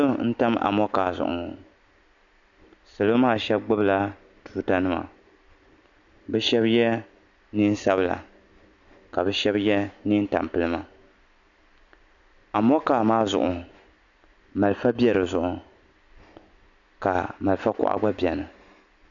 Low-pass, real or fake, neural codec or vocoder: 7.2 kHz; real; none